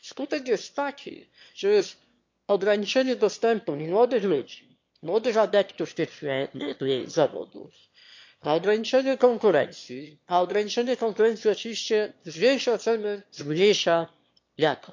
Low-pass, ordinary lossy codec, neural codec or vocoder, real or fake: 7.2 kHz; MP3, 48 kbps; autoencoder, 22.05 kHz, a latent of 192 numbers a frame, VITS, trained on one speaker; fake